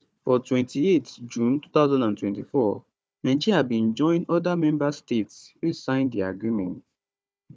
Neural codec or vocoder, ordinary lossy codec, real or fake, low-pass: codec, 16 kHz, 4 kbps, FunCodec, trained on Chinese and English, 50 frames a second; none; fake; none